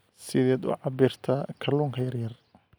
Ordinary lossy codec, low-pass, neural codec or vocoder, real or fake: none; none; none; real